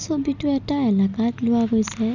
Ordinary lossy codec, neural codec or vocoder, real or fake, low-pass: none; none; real; 7.2 kHz